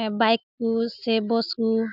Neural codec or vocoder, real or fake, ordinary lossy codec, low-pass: none; real; none; 5.4 kHz